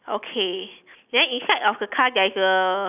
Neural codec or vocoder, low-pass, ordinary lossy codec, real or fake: none; 3.6 kHz; none; real